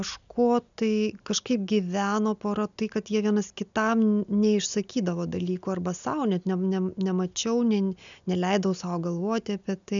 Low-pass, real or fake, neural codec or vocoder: 7.2 kHz; real; none